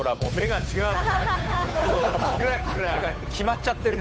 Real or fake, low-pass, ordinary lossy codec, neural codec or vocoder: fake; none; none; codec, 16 kHz, 8 kbps, FunCodec, trained on Chinese and English, 25 frames a second